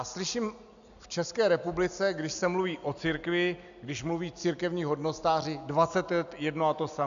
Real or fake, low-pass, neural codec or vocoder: real; 7.2 kHz; none